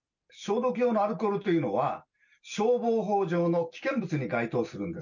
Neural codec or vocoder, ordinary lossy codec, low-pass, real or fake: none; none; 7.2 kHz; real